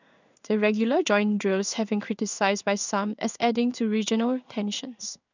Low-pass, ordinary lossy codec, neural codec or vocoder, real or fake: 7.2 kHz; none; codec, 16 kHz in and 24 kHz out, 1 kbps, XY-Tokenizer; fake